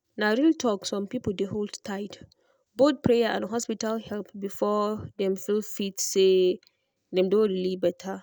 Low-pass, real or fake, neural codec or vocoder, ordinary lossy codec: none; real; none; none